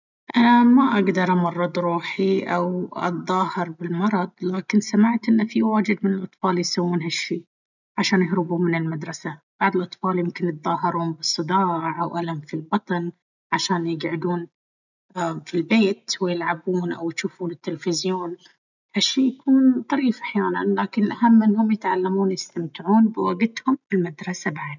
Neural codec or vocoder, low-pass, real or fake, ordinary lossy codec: none; 7.2 kHz; real; none